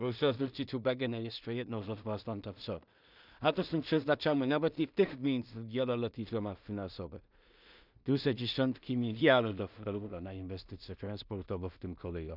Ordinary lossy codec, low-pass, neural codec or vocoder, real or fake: none; 5.4 kHz; codec, 16 kHz in and 24 kHz out, 0.4 kbps, LongCat-Audio-Codec, two codebook decoder; fake